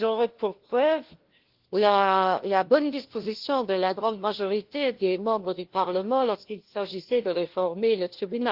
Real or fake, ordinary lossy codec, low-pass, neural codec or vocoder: fake; Opus, 16 kbps; 5.4 kHz; codec, 16 kHz, 1 kbps, FunCodec, trained on LibriTTS, 50 frames a second